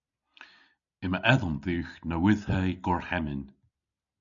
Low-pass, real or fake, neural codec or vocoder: 7.2 kHz; real; none